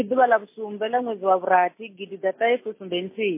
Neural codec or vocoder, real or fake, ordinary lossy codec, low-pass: none; real; MP3, 24 kbps; 3.6 kHz